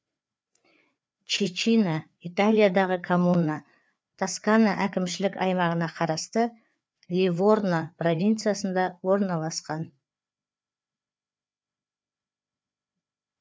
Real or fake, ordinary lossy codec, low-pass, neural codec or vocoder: fake; none; none; codec, 16 kHz, 4 kbps, FreqCodec, larger model